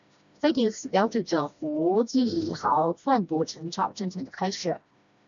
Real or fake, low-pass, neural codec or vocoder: fake; 7.2 kHz; codec, 16 kHz, 1 kbps, FreqCodec, smaller model